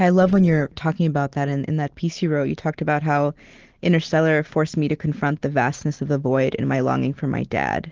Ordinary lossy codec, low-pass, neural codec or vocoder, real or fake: Opus, 16 kbps; 7.2 kHz; none; real